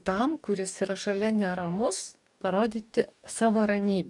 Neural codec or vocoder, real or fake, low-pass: codec, 44.1 kHz, 2.6 kbps, DAC; fake; 10.8 kHz